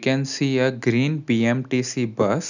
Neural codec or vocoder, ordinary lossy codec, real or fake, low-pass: none; none; real; 7.2 kHz